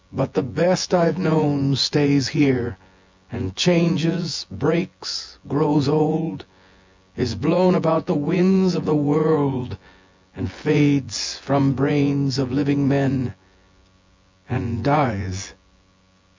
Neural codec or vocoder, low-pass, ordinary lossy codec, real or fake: vocoder, 24 kHz, 100 mel bands, Vocos; 7.2 kHz; MP3, 64 kbps; fake